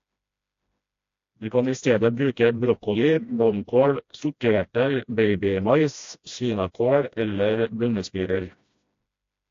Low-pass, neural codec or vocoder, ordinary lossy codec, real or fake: 7.2 kHz; codec, 16 kHz, 1 kbps, FreqCodec, smaller model; AAC, 48 kbps; fake